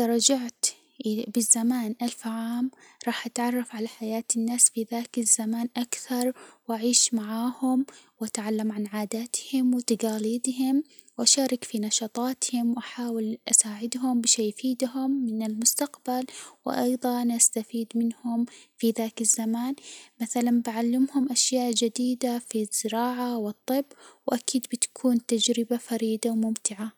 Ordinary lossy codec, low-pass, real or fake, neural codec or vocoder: none; none; real; none